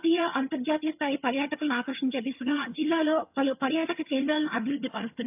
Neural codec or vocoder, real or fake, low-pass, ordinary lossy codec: vocoder, 22.05 kHz, 80 mel bands, HiFi-GAN; fake; 3.6 kHz; AAC, 32 kbps